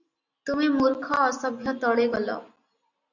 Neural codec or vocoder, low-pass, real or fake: none; 7.2 kHz; real